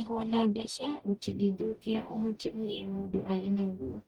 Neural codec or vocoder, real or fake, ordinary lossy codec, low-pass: codec, 44.1 kHz, 0.9 kbps, DAC; fake; Opus, 32 kbps; 19.8 kHz